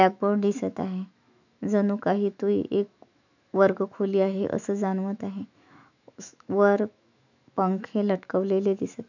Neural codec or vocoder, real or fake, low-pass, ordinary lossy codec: autoencoder, 48 kHz, 128 numbers a frame, DAC-VAE, trained on Japanese speech; fake; 7.2 kHz; none